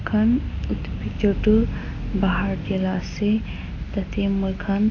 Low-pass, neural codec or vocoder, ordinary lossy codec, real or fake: 7.2 kHz; none; AAC, 32 kbps; real